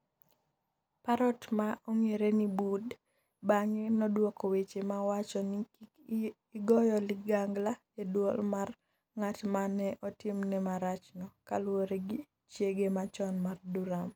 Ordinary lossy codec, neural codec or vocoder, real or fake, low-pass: none; none; real; none